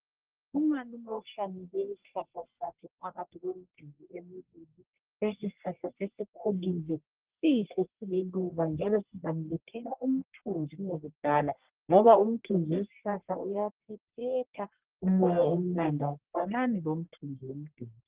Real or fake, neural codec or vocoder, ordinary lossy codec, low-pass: fake; codec, 44.1 kHz, 1.7 kbps, Pupu-Codec; Opus, 16 kbps; 3.6 kHz